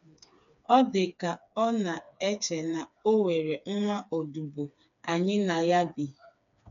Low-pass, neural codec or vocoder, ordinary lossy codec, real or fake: 7.2 kHz; codec, 16 kHz, 4 kbps, FreqCodec, smaller model; none; fake